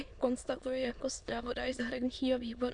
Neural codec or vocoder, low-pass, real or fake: autoencoder, 22.05 kHz, a latent of 192 numbers a frame, VITS, trained on many speakers; 9.9 kHz; fake